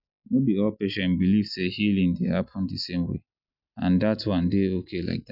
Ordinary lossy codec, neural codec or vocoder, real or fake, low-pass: none; none; real; 5.4 kHz